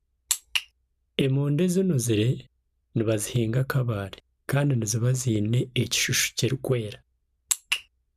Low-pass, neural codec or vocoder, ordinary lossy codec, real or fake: 14.4 kHz; none; none; real